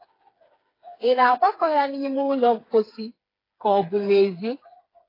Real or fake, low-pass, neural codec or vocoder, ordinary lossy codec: fake; 5.4 kHz; codec, 16 kHz, 4 kbps, FreqCodec, smaller model; AAC, 24 kbps